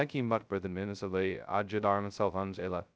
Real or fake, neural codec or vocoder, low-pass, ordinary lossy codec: fake; codec, 16 kHz, 0.2 kbps, FocalCodec; none; none